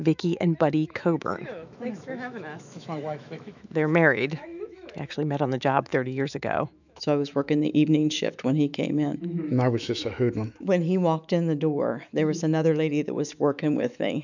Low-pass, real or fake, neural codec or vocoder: 7.2 kHz; fake; autoencoder, 48 kHz, 128 numbers a frame, DAC-VAE, trained on Japanese speech